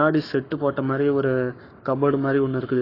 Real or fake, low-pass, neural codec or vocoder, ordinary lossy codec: fake; 5.4 kHz; codec, 44.1 kHz, 7.8 kbps, Pupu-Codec; AAC, 24 kbps